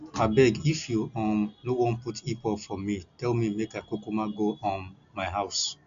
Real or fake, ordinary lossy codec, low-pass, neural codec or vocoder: real; none; 7.2 kHz; none